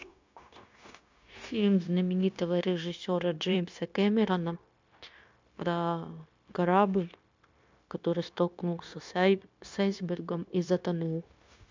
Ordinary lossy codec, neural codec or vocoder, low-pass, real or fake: MP3, 64 kbps; codec, 16 kHz, 0.9 kbps, LongCat-Audio-Codec; 7.2 kHz; fake